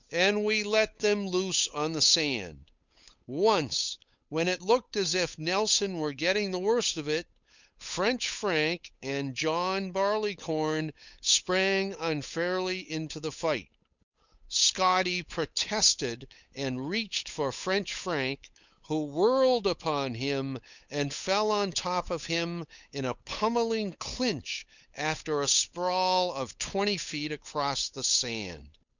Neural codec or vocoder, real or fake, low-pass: codec, 16 kHz, 8 kbps, FunCodec, trained on Chinese and English, 25 frames a second; fake; 7.2 kHz